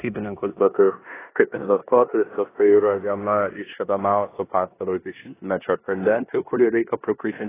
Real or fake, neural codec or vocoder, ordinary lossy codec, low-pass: fake; codec, 16 kHz in and 24 kHz out, 0.9 kbps, LongCat-Audio-Codec, four codebook decoder; AAC, 16 kbps; 3.6 kHz